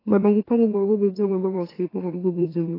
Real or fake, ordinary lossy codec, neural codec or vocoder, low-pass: fake; AAC, 24 kbps; autoencoder, 44.1 kHz, a latent of 192 numbers a frame, MeloTTS; 5.4 kHz